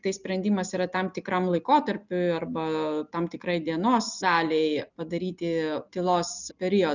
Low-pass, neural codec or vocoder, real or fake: 7.2 kHz; none; real